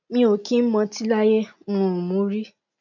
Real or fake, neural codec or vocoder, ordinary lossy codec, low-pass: real; none; none; 7.2 kHz